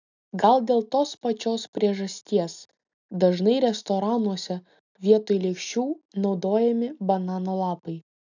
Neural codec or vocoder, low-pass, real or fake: none; 7.2 kHz; real